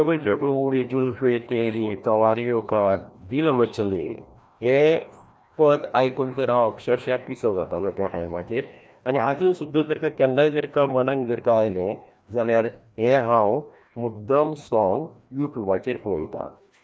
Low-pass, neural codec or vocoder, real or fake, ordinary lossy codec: none; codec, 16 kHz, 1 kbps, FreqCodec, larger model; fake; none